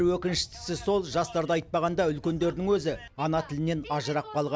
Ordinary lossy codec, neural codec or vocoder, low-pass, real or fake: none; none; none; real